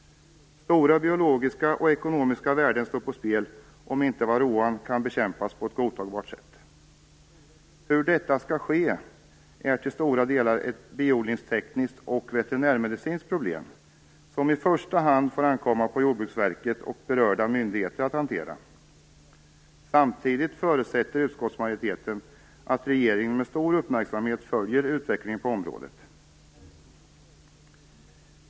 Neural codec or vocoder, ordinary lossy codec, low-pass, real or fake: none; none; none; real